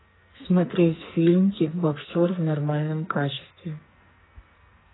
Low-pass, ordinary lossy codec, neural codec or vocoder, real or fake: 7.2 kHz; AAC, 16 kbps; codec, 44.1 kHz, 2.6 kbps, SNAC; fake